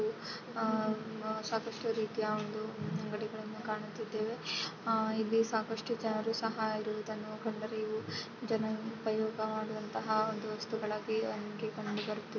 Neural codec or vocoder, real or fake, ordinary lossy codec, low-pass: none; real; none; 7.2 kHz